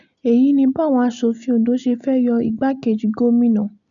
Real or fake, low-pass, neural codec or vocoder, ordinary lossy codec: real; 7.2 kHz; none; none